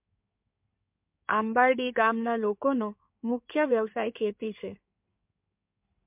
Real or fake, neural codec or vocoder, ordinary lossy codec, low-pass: fake; codec, 16 kHz in and 24 kHz out, 2.2 kbps, FireRedTTS-2 codec; MP3, 32 kbps; 3.6 kHz